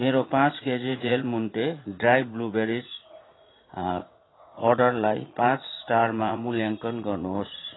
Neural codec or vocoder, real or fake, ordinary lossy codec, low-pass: vocoder, 44.1 kHz, 80 mel bands, Vocos; fake; AAC, 16 kbps; 7.2 kHz